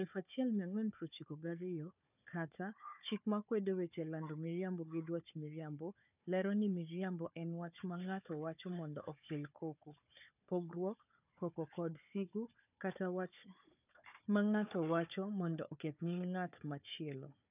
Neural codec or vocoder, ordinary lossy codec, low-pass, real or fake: codec, 16 kHz, 4 kbps, FunCodec, trained on Chinese and English, 50 frames a second; none; 3.6 kHz; fake